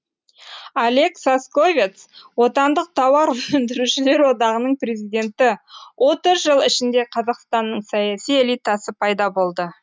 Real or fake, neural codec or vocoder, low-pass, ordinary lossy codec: real; none; none; none